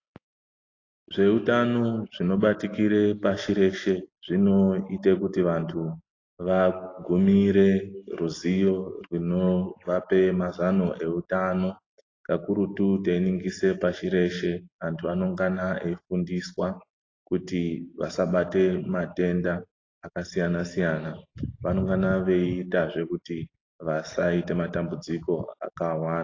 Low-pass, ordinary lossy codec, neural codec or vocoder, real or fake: 7.2 kHz; AAC, 32 kbps; none; real